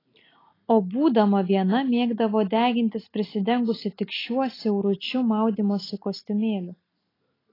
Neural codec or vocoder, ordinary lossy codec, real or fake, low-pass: none; AAC, 24 kbps; real; 5.4 kHz